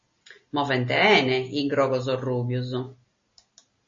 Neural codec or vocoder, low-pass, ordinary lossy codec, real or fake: none; 7.2 kHz; MP3, 32 kbps; real